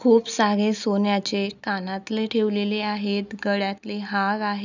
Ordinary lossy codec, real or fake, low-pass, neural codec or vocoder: none; real; 7.2 kHz; none